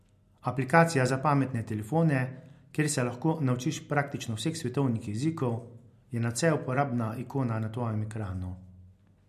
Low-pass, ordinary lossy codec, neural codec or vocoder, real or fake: 14.4 kHz; MP3, 64 kbps; none; real